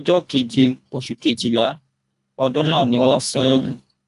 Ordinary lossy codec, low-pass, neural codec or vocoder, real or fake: none; 10.8 kHz; codec, 24 kHz, 1.5 kbps, HILCodec; fake